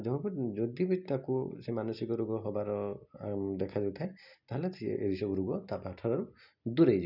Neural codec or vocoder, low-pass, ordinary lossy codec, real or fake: none; 5.4 kHz; none; real